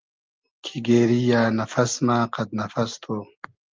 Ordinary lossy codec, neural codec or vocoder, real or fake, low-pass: Opus, 32 kbps; none; real; 7.2 kHz